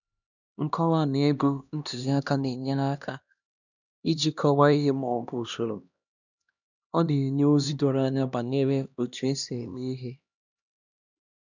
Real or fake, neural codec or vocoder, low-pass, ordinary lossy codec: fake; codec, 16 kHz, 1 kbps, X-Codec, HuBERT features, trained on LibriSpeech; 7.2 kHz; none